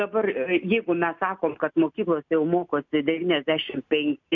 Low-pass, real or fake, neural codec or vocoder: 7.2 kHz; real; none